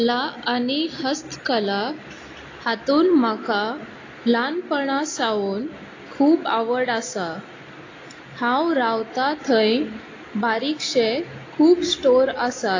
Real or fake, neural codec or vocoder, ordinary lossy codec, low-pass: real; none; AAC, 32 kbps; 7.2 kHz